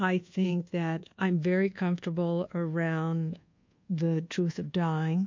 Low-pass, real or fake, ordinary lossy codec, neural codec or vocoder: 7.2 kHz; fake; MP3, 48 kbps; codec, 24 kHz, 1.2 kbps, DualCodec